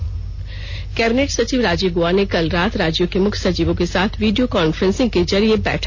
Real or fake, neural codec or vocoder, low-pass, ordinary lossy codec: real; none; none; none